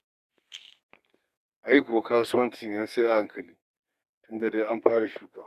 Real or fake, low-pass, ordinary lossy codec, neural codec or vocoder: fake; 14.4 kHz; Opus, 64 kbps; codec, 32 kHz, 1.9 kbps, SNAC